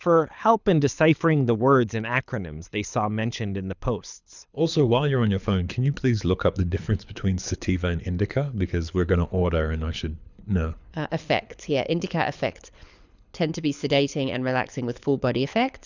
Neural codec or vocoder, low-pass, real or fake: codec, 24 kHz, 6 kbps, HILCodec; 7.2 kHz; fake